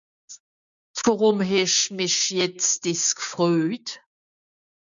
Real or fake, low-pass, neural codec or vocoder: fake; 7.2 kHz; codec, 16 kHz, 6 kbps, DAC